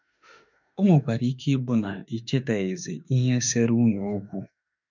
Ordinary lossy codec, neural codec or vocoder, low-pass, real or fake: none; autoencoder, 48 kHz, 32 numbers a frame, DAC-VAE, trained on Japanese speech; 7.2 kHz; fake